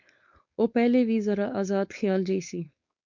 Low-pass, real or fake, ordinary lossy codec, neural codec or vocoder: 7.2 kHz; fake; MP3, 64 kbps; codec, 16 kHz, 4.8 kbps, FACodec